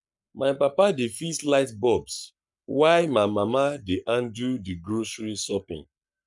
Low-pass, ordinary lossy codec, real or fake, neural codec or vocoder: 10.8 kHz; none; fake; codec, 44.1 kHz, 7.8 kbps, Pupu-Codec